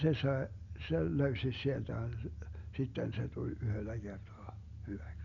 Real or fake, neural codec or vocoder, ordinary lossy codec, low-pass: real; none; none; 7.2 kHz